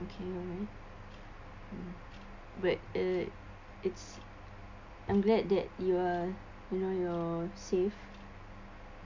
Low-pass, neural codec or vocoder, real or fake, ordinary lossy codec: 7.2 kHz; none; real; MP3, 64 kbps